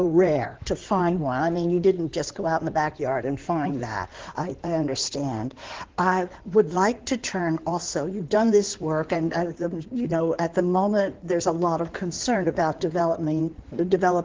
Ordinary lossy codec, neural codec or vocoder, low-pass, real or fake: Opus, 16 kbps; codec, 16 kHz in and 24 kHz out, 2.2 kbps, FireRedTTS-2 codec; 7.2 kHz; fake